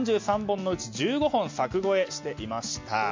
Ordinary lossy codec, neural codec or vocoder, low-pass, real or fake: MP3, 64 kbps; none; 7.2 kHz; real